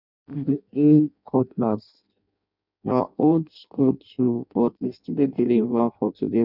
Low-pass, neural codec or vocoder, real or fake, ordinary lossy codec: 5.4 kHz; codec, 16 kHz in and 24 kHz out, 0.6 kbps, FireRedTTS-2 codec; fake; none